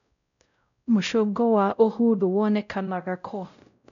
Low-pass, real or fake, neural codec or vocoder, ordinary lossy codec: 7.2 kHz; fake; codec, 16 kHz, 0.5 kbps, X-Codec, WavLM features, trained on Multilingual LibriSpeech; none